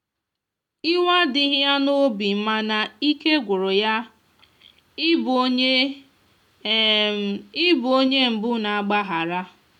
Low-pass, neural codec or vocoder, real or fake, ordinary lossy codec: 19.8 kHz; none; real; none